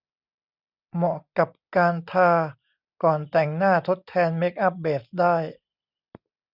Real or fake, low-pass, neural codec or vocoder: real; 5.4 kHz; none